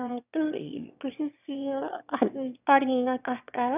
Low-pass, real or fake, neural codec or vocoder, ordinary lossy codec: 3.6 kHz; fake; autoencoder, 22.05 kHz, a latent of 192 numbers a frame, VITS, trained on one speaker; none